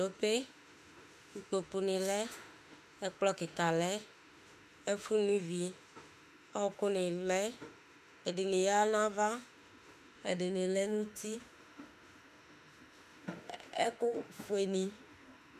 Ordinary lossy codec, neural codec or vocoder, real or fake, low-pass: MP3, 96 kbps; autoencoder, 48 kHz, 32 numbers a frame, DAC-VAE, trained on Japanese speech; fake; 14.4 kHz